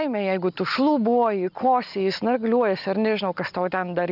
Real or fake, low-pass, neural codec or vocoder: real; 5.4 kHz; none